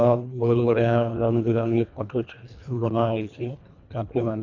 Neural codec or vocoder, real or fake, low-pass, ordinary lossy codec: codec, 24 kHz, 1.5 kbps, HILCodec; fake; 7.2 kHz; none